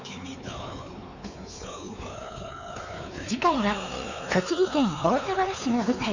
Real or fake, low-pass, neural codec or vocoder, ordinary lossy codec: fake; 7.2 kHz; codec, 16 kHz, 4 kbps, X-Codec, WavLM features, trained on Multilingual LibriSpeech; none